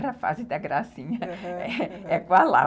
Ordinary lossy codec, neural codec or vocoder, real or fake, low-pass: none; none; real; none